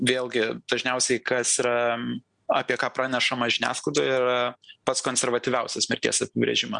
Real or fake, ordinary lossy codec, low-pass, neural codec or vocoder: real; Opus, 64 kbps; 9.9 kHz; none